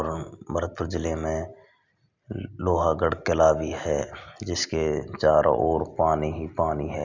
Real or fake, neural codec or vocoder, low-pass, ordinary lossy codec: real; none; 7.2 kHz; none